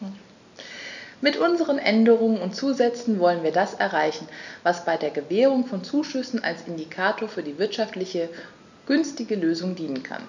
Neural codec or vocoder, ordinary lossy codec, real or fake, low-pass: none; none; real; 7.2 kHz